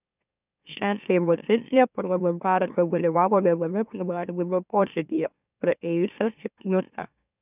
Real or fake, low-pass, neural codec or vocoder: fake; 3.6 kHz; autoencoder, 44.1 kHz, a latent of 192 numbers a frame, MeloTTS